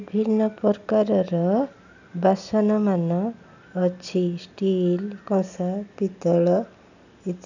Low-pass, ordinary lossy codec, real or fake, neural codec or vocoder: 7.2 kHz; none; real; none